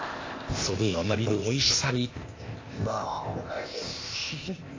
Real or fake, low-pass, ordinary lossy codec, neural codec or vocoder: fake; 7.2 kHz; AAC, 32 kbps; codec, 16 kHz, 0.8 kbps, ZipCodec